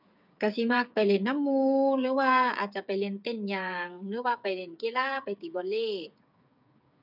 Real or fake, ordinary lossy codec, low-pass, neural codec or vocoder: fake; none; 5.4 kHz; codec, 16 kHz, 8 kbps, FreqCodec, smaller model